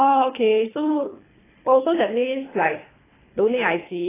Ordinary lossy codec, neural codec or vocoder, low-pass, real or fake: AAC, 16 kbps; codec, 24 kHz, 3 kbps, HILCodec; 3.6 kHz; fake